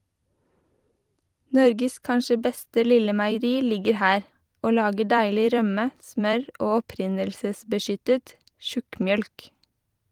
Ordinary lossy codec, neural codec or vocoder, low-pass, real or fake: Opus, 24 kbps; vocoder, 44.1 kHz, 128 mel bands every 256 samples, BigVGAN v2; 19.8 kHz; fake